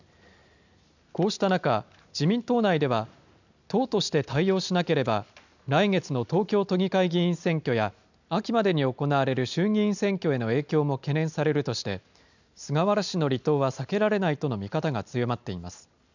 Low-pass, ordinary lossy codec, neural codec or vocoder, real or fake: 7.2 kHz; none; none; real